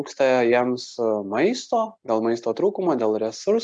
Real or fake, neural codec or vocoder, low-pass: real; none; 9.9 kHz